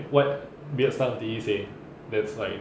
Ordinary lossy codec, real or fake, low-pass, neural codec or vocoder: none; real; none; none